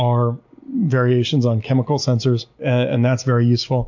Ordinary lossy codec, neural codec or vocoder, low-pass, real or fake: MP3, 48 kbps; none; 7.2 kHz; real